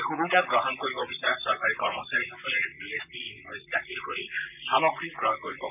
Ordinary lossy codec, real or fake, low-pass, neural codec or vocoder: none; fake; 3.6 kHz; vocoder, 44.1 kHz, 128 mel bands, Pupu-Vocoder